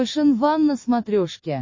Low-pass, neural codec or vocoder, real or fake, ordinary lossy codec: 7.2 kHz; none; real; MP3, 32 kbps